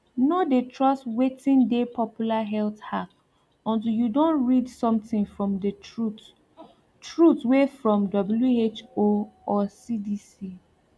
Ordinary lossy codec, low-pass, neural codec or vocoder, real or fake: none; none; none; real